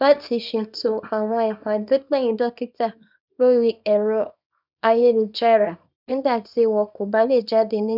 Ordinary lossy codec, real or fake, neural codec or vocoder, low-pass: none; fake; codec, 24 kHz, 0.9 kbps, WavTokenizer, small release; 5.4 kHz